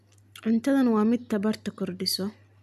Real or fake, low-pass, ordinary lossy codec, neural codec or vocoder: real; 14.4 kHz; none; none